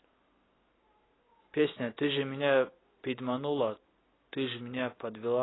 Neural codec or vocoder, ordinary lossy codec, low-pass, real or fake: none; AAC, 16 kbps; 7.2 kHz; real